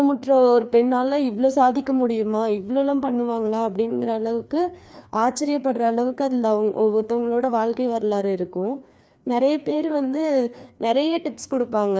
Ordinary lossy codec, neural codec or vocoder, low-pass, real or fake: none; codec, 16 kHz, 2 kbps, FreqCodec, larger model; none; fake